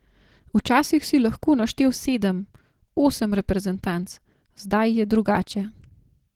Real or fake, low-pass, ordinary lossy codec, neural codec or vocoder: real; 19.8 kHz; Opus, 16 kbps; none